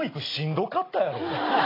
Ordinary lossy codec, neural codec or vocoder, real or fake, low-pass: AAC, 24 kbps; none; real; 5.4 kHz